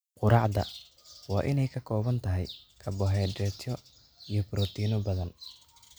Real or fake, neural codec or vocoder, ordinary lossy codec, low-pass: real; none; none; none